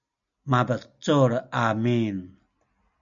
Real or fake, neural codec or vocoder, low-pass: real; none; 7.2 kHz